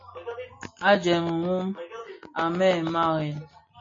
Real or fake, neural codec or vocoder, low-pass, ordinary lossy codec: real; none; 7.2 kHz; MP3, 32 kbps